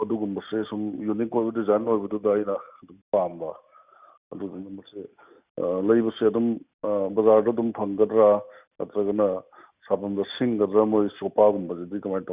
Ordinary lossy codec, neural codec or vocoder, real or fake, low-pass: Opus, 64 kbps; none; real; 3.6 kHz